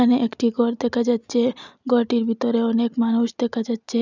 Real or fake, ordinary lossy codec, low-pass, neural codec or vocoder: real; none; 7.2 kHz; none